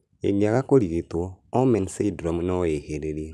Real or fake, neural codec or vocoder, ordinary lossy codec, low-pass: real; none; none; none